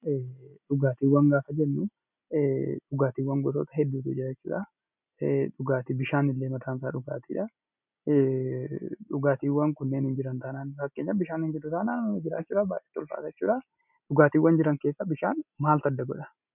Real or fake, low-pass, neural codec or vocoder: real; 3.6 kHz; none